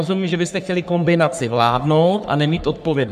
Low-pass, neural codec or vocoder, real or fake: 14.4 kHz; codec, 44.1 kHz, 3.4 kbps, Pupu-Codec; fake